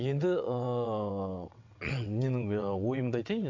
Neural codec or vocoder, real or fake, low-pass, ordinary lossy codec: vocoder, 22.05 kHz, 80 mel bands, WaveNeXt; fake; 7.2 kHz; MP3, 64 kbps